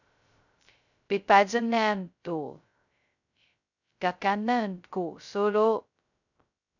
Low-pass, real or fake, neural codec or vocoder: 7.2 kHz; fake; codec, 16 kHz, 0.2 kbps, FocalCodec